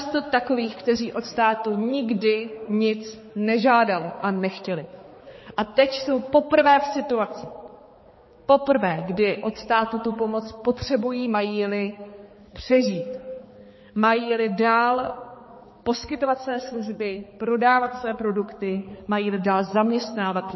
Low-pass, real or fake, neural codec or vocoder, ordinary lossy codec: 7.2 kHz; fake; codec, 16 kHz, 4 kbps, X-Codec, HuBERT features, trained on balanced general audio; MP3, 24 kbps